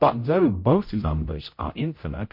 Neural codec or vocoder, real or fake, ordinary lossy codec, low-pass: codec, 16 kHz, 0.5 kbps, X-Codec, HuBERT features, trained on general audio; fake; MP3, 32 kbps; 5.4 kHz